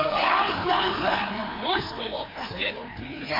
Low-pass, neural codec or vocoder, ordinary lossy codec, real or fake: 5.4 kHz; codec, 16 kHz, 2 kbps, FreqCodec, larger model; MP3, 32 kbps; fake